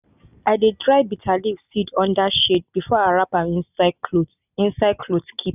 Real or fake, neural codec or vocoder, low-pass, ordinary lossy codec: real; none; 3.6 kHz; none